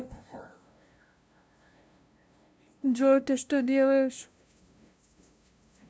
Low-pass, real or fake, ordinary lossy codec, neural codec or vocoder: none; fake; none; codec, 16 kHz, 0.5 kbps, FunCodec, trained on LibriTTS, 25 frames a second